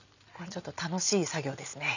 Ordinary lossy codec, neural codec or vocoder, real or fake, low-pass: none; none; real; 7.2 kHz